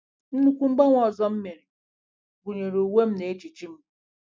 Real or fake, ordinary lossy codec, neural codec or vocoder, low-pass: real; none; none; none